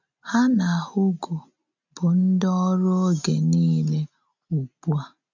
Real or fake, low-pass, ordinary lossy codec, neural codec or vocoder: real; 7.2 kHz; none; none